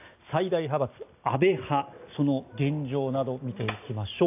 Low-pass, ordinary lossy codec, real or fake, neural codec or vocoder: 3.6 kHz; AAC, 32 kbps; real; none